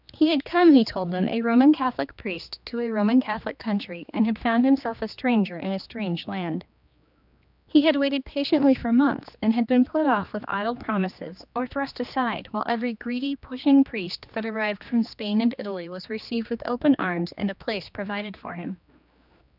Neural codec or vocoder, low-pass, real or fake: codec, 16 kHz, 2 kbps, X-Codec, HuBERT features, trained on general audio; 5.4 kHz; fake